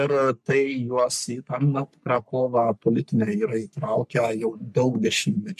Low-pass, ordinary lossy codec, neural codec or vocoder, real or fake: 14.4 kHz; MP3, 64 kbps; codec, 44.1 kHz, 3.4 kbps, Pupu-Codec; fake